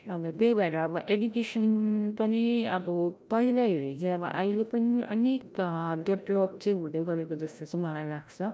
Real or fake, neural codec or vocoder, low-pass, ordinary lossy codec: fake; codec, 16 kHz, 0.5 kbps, FreqCodec, larger model; none; none